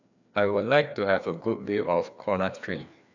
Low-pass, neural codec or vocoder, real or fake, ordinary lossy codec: 7.2 kHz; codec, 16 kHz, 2 kbps, FreqCodec, larger model; fake; none